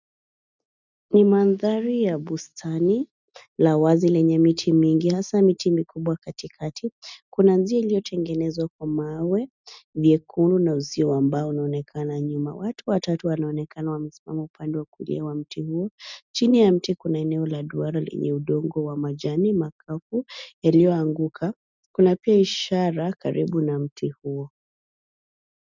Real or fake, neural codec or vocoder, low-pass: real; none; 7.2 kHz